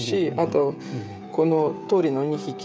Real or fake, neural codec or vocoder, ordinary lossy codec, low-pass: fake; codec, 16 kHz, 16 kbps, FreqCodec, smaller model; none; none